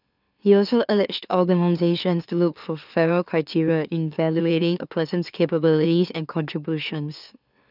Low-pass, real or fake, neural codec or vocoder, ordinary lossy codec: 5.4 kHz; fake; autoencoder, 44.1 kHz, a latent of 192 numbers a frame, MeloTTS; none